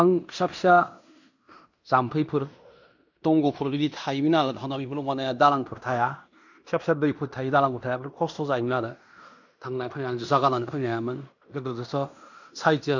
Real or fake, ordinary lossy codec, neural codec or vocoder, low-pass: fake; none; codec, 16 kHz in and 24 kHz out, 0.9 kbps, LongCat-Audio-Codec, fine tuned four codebook decoder; 7.2 kHz